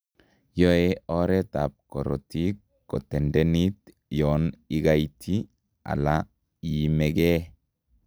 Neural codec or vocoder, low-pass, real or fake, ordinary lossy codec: none; none; real; none